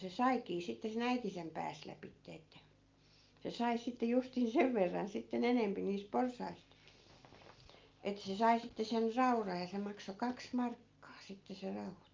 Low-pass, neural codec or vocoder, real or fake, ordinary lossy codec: 7.2 kHz; none; real; Opus, 24 kbps